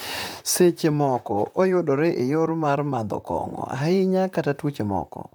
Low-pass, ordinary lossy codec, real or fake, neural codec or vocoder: none; none; fake; vocoder, 44.1 kHz, 128 mel bands, Pupu-Vocoder